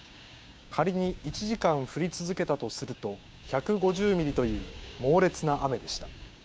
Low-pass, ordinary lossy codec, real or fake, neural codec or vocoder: none; none; fake; codec, 16 kHz, 6 kbps, DAC